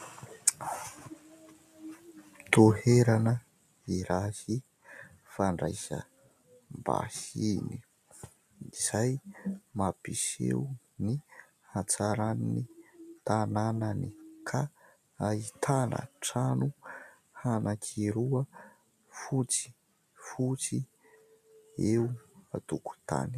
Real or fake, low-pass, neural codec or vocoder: real; 14.4 kHz; none